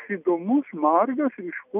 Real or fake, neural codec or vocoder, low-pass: real; none; 3.6 kHz